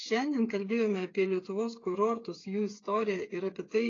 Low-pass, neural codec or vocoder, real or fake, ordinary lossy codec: 7.2 kHz; codec, 16 kHz, 8 kbps, FreqCodec, smaller model; fake; AAC, 32 kbps